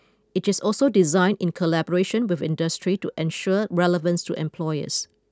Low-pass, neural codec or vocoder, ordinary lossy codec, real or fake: none; none; none; real